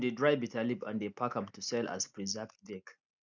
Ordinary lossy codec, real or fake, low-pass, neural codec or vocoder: none; real; 7.2 kHz; none